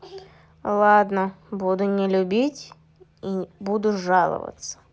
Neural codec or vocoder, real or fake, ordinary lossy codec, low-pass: none; real; none; none